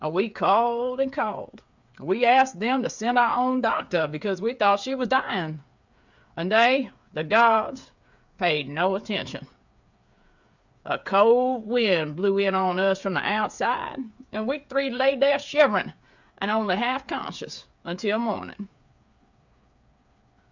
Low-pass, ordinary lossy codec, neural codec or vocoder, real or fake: 7.2 kHz; Opus, 64 kbps; codec, 16 kHz, 8 kbps, FreqCodec, smaller model; fake